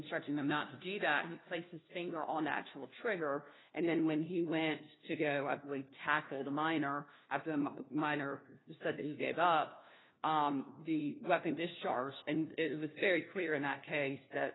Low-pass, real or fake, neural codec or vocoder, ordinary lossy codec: 7.2 kHz; fake; codec, 16 kHz, 1 kbps, FunCodec, trained on LibriTTS, 50 frames a second; AAC, 16 kbps